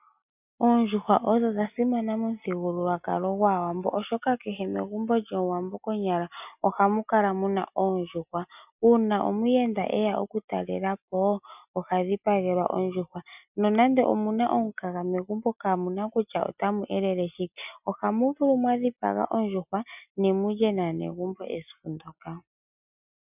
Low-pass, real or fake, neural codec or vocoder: 3.6 kHz; real; none